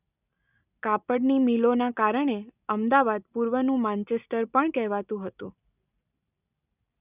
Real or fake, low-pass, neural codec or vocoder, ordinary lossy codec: real; 3.6 kHz; none; none